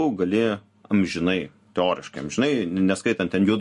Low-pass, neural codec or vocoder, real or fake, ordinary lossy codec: 14.4 kHz; none; real; MP3, 48 kbps